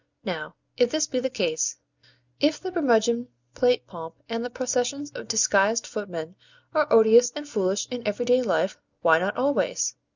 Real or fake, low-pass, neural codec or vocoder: real; 7.2 kHz; none